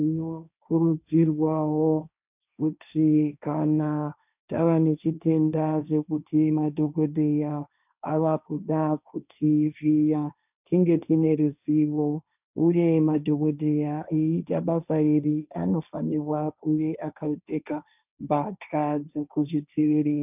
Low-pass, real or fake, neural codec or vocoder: 3.6 kHz; fake; codec, 16 kHz, 1.1 kbps, Voila-Tokenizer